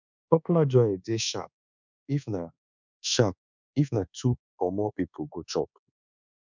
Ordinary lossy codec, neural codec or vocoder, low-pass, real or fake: none; codec, 24 kHz, 1.2 kbps, DualCodec; 7.2 kHz; fake